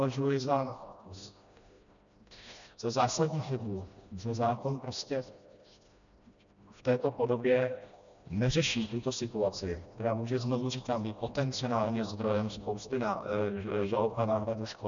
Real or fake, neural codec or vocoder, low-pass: fake; codec, 16 kHz, 1 kbps, FreqCodec, smaller model; 7.2 kHz